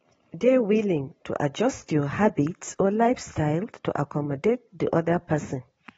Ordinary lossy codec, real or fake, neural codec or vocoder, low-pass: AAC, 24 kbps; real; none; 19.8 kHz